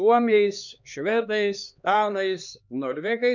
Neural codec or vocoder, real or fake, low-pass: codec, 16 kHz, 2 kbps, X-Codec, HuBERT features, trained on LibriSpeech; fake; 7.2 kHz